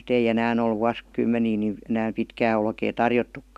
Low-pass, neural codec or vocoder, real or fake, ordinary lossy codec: 14.4 kHz; none; real; none